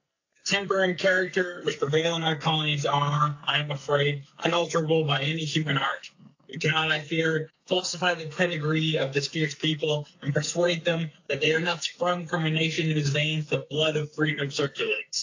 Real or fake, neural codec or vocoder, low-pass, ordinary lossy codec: fake; codec, 44.1 kHz, 2.6 kbps, SNAC; 7.2 kHz; AAC, 48 kbps